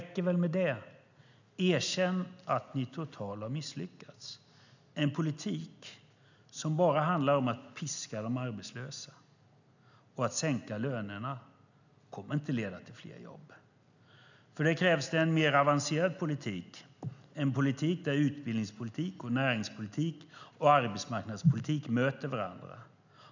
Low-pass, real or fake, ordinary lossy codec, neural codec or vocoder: 7.2 kHz; real; none; none